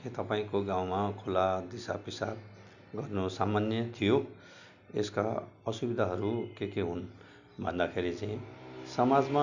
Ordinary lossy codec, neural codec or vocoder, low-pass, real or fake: none; none; 7.2 kHz; real